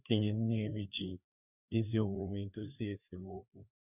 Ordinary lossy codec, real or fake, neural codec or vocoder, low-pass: none; fake; codec, 16 kHz, 2 kbps, FreqCodec, larger model; 3.6 kHz